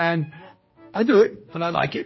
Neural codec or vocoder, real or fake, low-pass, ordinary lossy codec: codec, 16 kHz, 1 kbps, X-Codec, HuBERT features, trained on general audio; fake; 7.2 kHz; MP3, 24 kbps